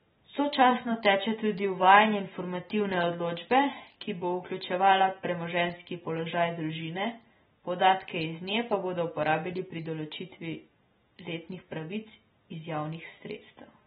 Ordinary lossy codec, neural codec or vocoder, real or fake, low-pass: AAC, 16 kbps; none; real; 19.8 kHz